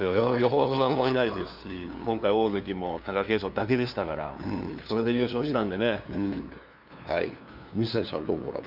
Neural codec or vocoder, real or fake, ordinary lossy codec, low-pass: codec, 16 kHz, 2 kbps, FunCodec, trained on LibriTTS, 25 frames a second; fake; none; 5.4 kHz